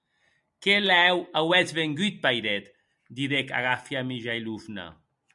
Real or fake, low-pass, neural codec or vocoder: real; 10.8 kHz; none